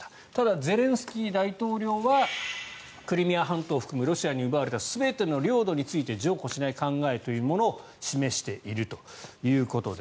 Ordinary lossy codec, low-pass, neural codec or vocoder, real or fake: none; none; none; real